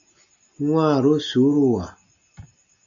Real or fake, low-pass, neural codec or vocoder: real; 7.2 kHz; none